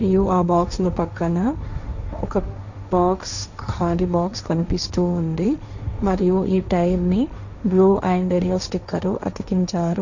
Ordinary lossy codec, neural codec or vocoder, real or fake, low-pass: none; codec, 16 kHz, 1.1 kbps, Voila-Tokenizer; fake; 7.2 kHz